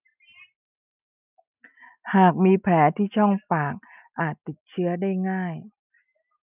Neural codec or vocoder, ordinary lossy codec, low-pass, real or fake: none; none; 3.6 kHz; real